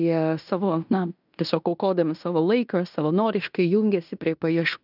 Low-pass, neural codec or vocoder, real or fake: 5.4 kHz; codec, 16 kHz in and 24 kHz out, 0.9 kbps, LongCat-Audio-Codec, fine tuned four codebook decoder; fake